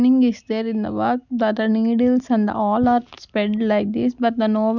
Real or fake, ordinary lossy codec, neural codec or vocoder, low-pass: real; none; none; 7.2 kHz